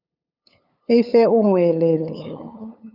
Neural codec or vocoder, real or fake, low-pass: codec, 16 kHz, 8 kbps, FunCodec, trained on LibriTTS, 25 frames a second; fake; 5.4 kHz